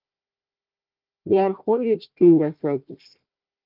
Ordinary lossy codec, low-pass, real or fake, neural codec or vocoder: Opus, 32 kbps; 5.4 kHz; fake; codec, 16 kHz, 1 kbps, FunCodec, trained on Chinese and English, 50 frames a second